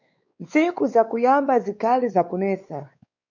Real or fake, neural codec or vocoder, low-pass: fake; codec, 16 kHz, 4 kbps, X-Codec, WavLM features, trained on Multilingual LibriSpeech; 7.2 kHz